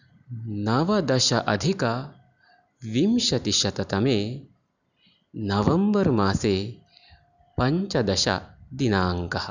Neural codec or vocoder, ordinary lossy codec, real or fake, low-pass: none; none; real; 7.2 kHz